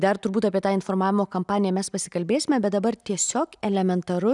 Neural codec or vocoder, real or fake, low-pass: none; real; 10.8 kHz